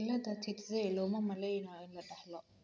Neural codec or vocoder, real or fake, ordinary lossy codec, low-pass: none; real; none; none